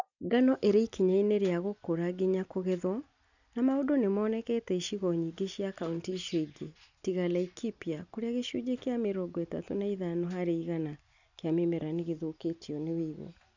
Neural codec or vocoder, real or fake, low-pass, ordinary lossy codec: none; real; 7.2 kHz; none